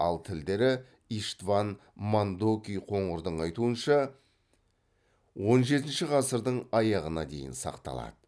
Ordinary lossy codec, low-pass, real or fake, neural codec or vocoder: none; none; real; none